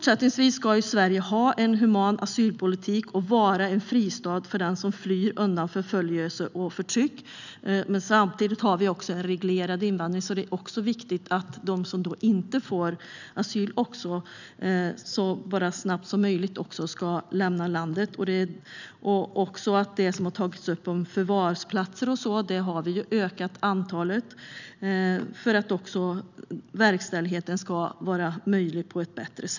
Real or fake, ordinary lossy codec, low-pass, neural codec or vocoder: real; none; 7.2 kHz; none